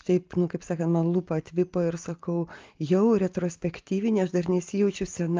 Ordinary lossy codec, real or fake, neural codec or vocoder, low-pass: Opus, 24 kbps; real; none; 7.2 kHz